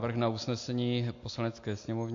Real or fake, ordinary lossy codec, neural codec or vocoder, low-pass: real; AAC, 48 kbps; none; 7.2 kHz